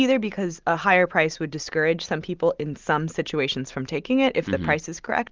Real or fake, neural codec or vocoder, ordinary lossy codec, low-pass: real; none; Opus, 24 kbps; 7.2 kHz